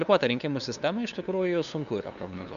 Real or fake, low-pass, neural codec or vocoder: fake; 7.2 kHz; codec, 16 kHz, 2 kbps, FunCodec, trained on LibriTTS, 25 frames a second